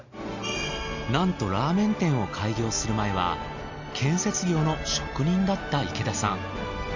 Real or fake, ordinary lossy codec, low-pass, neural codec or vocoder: real; none; 7.2 kHz; none